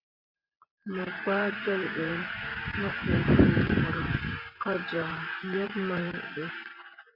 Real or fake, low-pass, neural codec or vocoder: fake; 5.4 kHz; vocoder, 24 kHz, 100 mel bands, Vocos